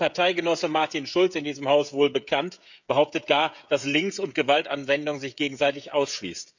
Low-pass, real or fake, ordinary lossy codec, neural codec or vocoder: 7.2 kHz; fake; none; codec, 16 kHz, 16 kbps, FreqCodec, smaller model